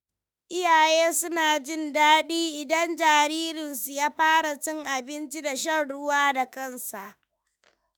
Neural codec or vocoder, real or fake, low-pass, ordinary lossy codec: autoencoder, 48 kHz, 32 numbers a frame, DAC-VAE, trained on Japanese speech; fake; none; none